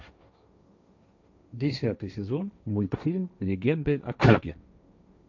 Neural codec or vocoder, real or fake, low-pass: codec, 16 kHz, 1.1 kbps, Voila-Tokenizer; fake; 7.2 kHz